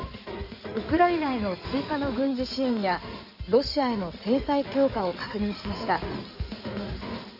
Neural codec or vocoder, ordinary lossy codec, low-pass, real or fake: codec, 16 kHz in and 24 kHz out, 2.2 kbps, FireRedTTS-2 codec; none; 5.4 kHz; fake